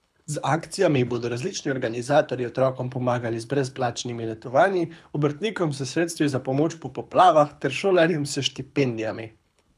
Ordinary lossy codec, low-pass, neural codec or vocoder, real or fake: none; none; codec, 24 kHz, 6 kbps, HILCodec; fake